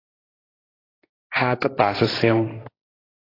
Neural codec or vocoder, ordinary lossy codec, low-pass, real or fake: codec, 16 kHz, 1.1 kbps, Voila-Tokenizer; AAC, 24 kbps; 5.4 kHz; fake